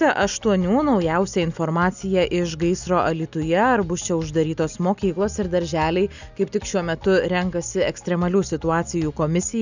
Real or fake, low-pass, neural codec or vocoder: real; 7.2 kHz; none